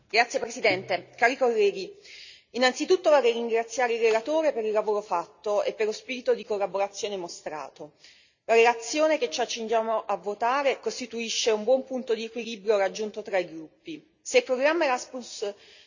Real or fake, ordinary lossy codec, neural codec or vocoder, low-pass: real; none; none; 7.2 kHz